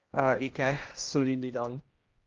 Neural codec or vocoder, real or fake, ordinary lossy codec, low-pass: codec, 16 kHz, 1 kbps, X-Codec, HuBERT features, trained on general audio; fake; Opus, 32 kbps; 7.2 kHz